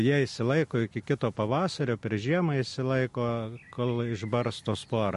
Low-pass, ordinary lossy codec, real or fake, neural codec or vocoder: 14.4 kHz; MP3, 48 kbps; real; none